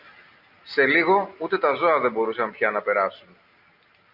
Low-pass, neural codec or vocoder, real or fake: 5.4 kHz; none; real